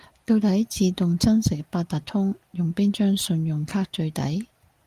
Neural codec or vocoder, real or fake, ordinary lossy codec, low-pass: none; real; Opus, 32 kbps; 14.4 kHz